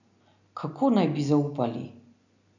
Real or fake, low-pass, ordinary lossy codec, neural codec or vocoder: real; 7.2 kHz; none; none